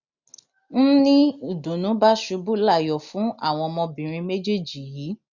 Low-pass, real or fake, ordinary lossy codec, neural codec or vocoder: 7.2 kHz; real; none; none